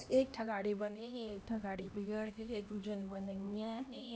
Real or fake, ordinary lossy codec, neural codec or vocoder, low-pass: fake; none; codec, 16 kHz, 1 kbps, X-Codec, HuBERT features, trained on LibriSpeech; none